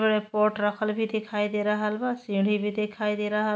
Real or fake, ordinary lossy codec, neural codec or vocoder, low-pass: real; none; none; none